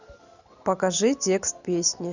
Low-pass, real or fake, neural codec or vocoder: 7.2 kHz; real; none